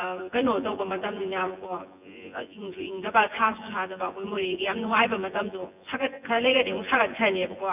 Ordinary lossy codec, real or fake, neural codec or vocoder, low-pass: none; fake; vocoder, 24 kHz, 100 mel bands, Vocos; 3.6 kHz